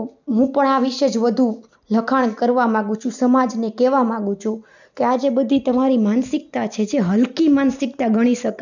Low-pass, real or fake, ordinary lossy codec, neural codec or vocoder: 7.2 kHz; real; none; none